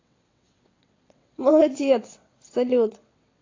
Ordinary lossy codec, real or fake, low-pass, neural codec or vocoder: AAC, 32 kbps; fake; 7.2 kHz; vocoder, 22.05 kHz, 80 mel bands, WaveNeXt